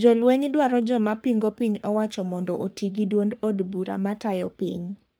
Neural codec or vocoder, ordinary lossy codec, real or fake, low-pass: codec, 44.1 kHz, 3.4 kbps, Pupu-Codec; none; fake; none